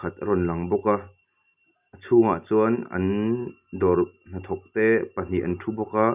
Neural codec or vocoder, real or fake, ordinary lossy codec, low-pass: none; real; none; 3.6 kHz